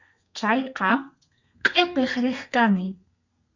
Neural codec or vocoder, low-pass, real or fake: codec, 32 kHz, 1.9 kbps, SNAC; 7.2 kHz; fake